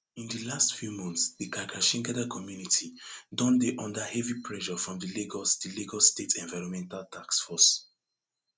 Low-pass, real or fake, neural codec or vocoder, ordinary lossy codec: none; real; none; none